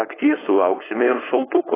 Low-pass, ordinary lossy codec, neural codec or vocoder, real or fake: 3.6 kHz; AAC, 16 kbps; codec, 16 kHz, 4.8 kbps, FACodec; fake